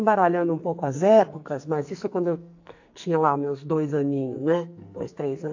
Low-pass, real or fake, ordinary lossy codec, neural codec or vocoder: 7.2 kHz; fake; AAC, 48 kbps; codec, 44.1 kHz, 2.6 kbps, SNAC